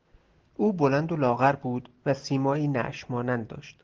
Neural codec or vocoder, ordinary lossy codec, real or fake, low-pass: none; Opus, 16 kbps; real; 7.2 kHz